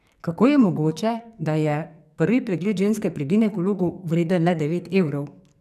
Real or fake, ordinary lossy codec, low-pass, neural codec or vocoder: fake; none; 14.4 kHz; codec, 44.1 kHz, 2.6 kbps, SNAC